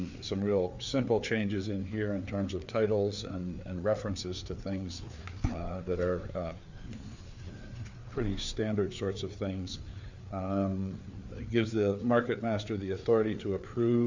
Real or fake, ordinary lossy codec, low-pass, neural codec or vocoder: fake; Opus, 64 kbps; 7.2 kHz; codec, 16 kHz, 4 kbps, FreqCodec, larger model